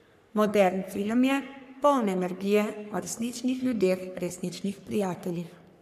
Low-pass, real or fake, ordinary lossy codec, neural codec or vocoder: 14.4 kHz; fake; none; codec, 44.1 kHz, 3.4 kbps, Pupu-Codec